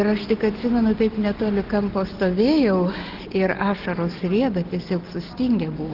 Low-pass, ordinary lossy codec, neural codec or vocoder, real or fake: 5.4 kHz; Opus, 16 kbps; none; real